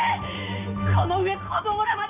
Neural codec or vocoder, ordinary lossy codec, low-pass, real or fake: none; none; 3.6 kHz; real